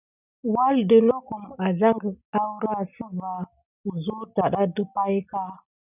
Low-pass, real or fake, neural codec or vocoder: 3.6 kHz; real; none